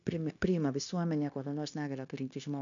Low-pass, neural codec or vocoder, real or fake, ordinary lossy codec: 7.2 kHz; codec, 16 kHz, 0.9 kbps, LongCat-Audio-Codec; fake; MP3, 96 kbps